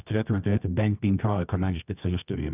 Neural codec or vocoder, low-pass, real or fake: codec, 24 kHz, 0.9 kbps, WavTokenizer, medium music audio release; 3.6 kHz; fake